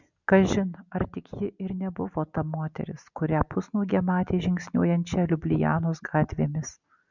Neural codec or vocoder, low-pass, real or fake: none; 7.2 kHz; real